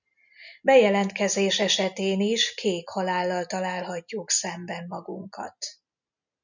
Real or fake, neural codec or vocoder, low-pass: real; none; 7.2 kHz